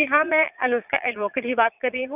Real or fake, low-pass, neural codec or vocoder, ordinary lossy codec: fake; 3.6 kHz; vocoder, 22.05 kHz, 80 mel bands, Vocos; none